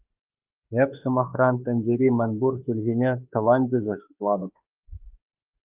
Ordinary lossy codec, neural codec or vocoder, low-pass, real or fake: Opus, 32 kbps; autoencoder, 48 kHz, 32 numbers a frame, DAC-VAE, trained on Japanese speech; 3.6 kHz; fake